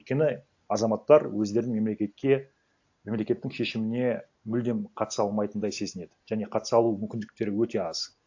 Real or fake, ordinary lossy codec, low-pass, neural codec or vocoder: real; AAC, 48 kbps; 7.2 kHz; none